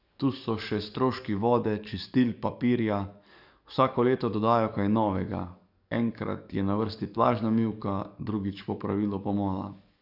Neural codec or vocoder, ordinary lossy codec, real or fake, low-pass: codec, 44.1 kHz, 7.8 kbps, DAC; none; fake; 5.4 kHz